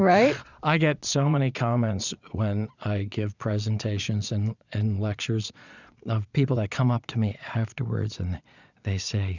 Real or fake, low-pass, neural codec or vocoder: fake; 7.2 kHz; vocoder, 22.05 kHz, 80 mel bands, Vocos